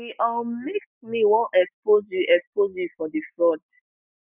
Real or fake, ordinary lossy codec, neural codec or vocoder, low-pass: real; none; none; 3.6 kHz